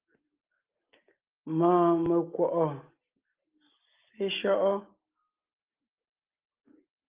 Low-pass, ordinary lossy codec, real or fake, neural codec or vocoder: 3.6 kHz; Opus, 24 kbps; real; none